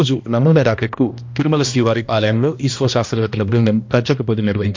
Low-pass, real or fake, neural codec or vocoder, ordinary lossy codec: 7.2 kHz; fake; codec, 16 kHz, 1 kbps, X-Codec, HuBERT features, trained on balanced general audio; MP3, 48 kbps